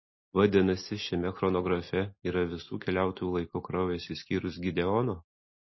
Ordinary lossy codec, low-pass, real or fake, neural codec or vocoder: MP3, 24 kbps; 7.2 kHz; real; none